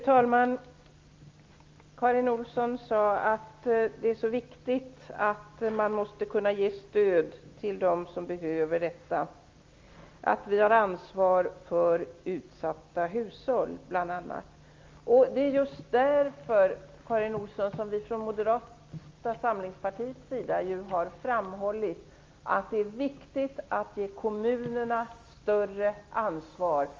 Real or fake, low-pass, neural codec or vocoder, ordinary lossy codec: real; 7.2 kHz; none; Opus, 32 kbps